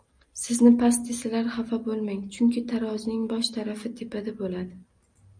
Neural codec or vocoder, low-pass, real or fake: none; 9.9 kHz; real